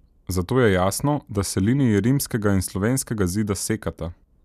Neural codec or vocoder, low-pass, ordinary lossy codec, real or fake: none; 14.4 kHz; none; real